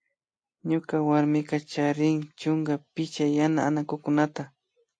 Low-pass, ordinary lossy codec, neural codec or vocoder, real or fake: 9.9 kHz; AAC, 48 kbps; none; real